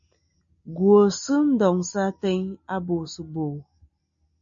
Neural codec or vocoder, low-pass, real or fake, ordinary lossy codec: none; 7.2 kHz; real; AAC, 64 kbps